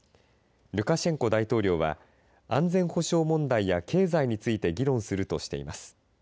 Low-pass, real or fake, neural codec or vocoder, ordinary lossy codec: none; real; none; none